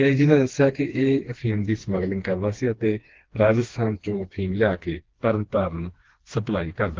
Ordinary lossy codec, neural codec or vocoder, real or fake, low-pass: Opus, 16 kbps; codec, 16 kHz, 2 kbps, FreqCodec, smaller model; fake; 7.2 kHz